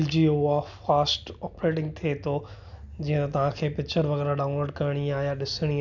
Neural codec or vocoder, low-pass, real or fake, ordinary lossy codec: none; 7.2 kHz; real; none